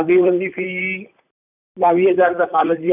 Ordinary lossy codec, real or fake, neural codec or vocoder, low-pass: none; fake; vocoder, 44.1 kHz, 128 mel bands, Pupu-Vocoder; 3.6 kHz